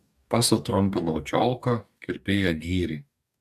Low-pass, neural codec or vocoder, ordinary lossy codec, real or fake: 14.4 kHz; codec, 44.1 kHz, 2.6 kbps, DAC; AAC, 96 kbps; fake